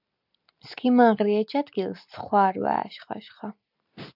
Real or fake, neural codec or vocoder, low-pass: real; none; 5.4 kHz